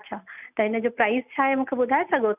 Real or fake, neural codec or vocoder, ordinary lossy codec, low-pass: real; none; none; 3.6 kHz